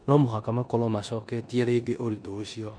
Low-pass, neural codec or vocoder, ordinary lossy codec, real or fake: 9.9 kHz; codec, 16 kHz in and 24 kHz out, 0.9 kbps, LongCat-Audio-Codec, four codebook decoder; none; fake